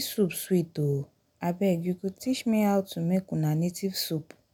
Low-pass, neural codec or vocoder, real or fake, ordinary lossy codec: none; none; real; none